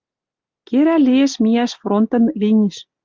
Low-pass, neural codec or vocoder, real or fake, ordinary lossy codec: 7.2 kHz; none; real; Opus, 32 kbps